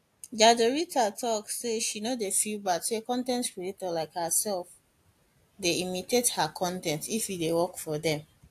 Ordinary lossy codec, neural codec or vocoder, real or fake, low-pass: AAC, 64 kbps; none; real; 14.4 kHz